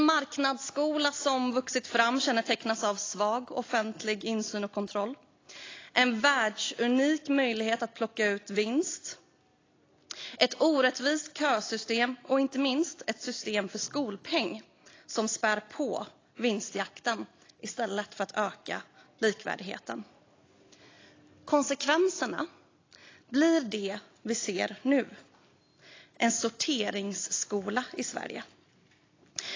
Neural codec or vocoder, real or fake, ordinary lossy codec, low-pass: none; real; AAC, 32 kbps; 7.2 kHz